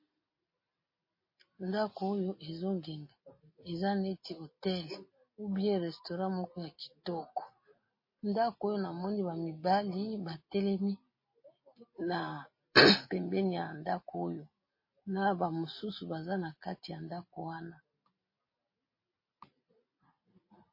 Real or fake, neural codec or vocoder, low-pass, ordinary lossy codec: real; none; 5.4 kHz; MP3, 24 kbps